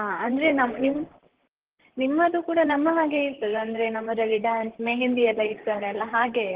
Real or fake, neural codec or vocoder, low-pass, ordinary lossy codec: fake; vocoder, 44.1 kHz, 128 mel bands, Pupu-Vocoder; 3.6 kHz; Opus, 16 kbps